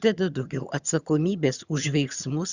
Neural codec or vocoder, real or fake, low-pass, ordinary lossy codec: vocoder, 22.05 kHz, 80 mel bands, HiFi-GAN; fake; 7.2 kHz; Opus, 64 kbps